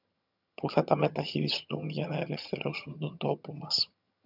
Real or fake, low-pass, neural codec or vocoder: fake; 5.4 kHz; vocoder, 22.05 kHz, 80 mel bands, HiFi-GAN